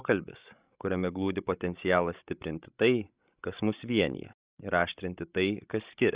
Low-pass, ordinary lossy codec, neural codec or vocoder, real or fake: 3.6 kHz; Opus, 64 kbps; codec, 16 kHz, 16 kbps, FreqCodec, larger model; fake